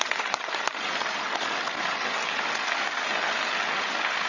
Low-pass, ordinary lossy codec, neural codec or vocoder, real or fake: 7.2 kHz; none; codec, 16 kHz, 16 kbps, FreqCodec, larger model; fake